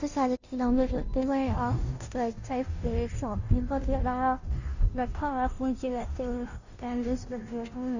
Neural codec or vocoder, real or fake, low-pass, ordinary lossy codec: codec, 16 kHz, 0.5 kbps, FunCodec, trained on Chinese and English, 25 frames a second; fake; 7.2 kHz; Opus, 64 kbps